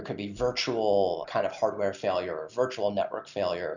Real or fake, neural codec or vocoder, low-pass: real; none; 7.2 kHz